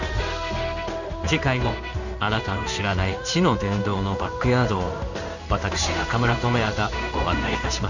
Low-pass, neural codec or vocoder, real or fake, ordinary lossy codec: 7.2 kHz; codec, 16 kHz in and 24 kHz out, 1 kbps, XY-Tokenizer; fake; none